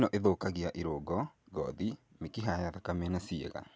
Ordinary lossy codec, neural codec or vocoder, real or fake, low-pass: none; none; real; none